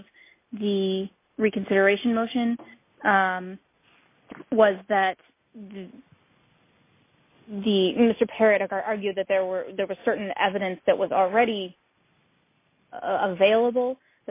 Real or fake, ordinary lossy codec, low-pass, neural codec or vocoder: real; MP3, 24 kbps; 3.6 kHz; none